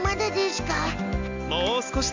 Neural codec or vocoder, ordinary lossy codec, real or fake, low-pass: none; MP3, 64 kbps; real; 7.2 kHz